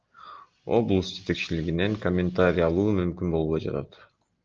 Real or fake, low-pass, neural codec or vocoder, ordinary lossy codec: real; 7.2 kHz; none; Opus, 24 kbps